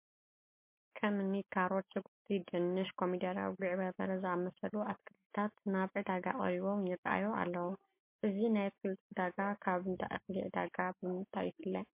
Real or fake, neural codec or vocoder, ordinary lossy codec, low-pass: real; none; MP3, 24 kbps; 3.6 kHz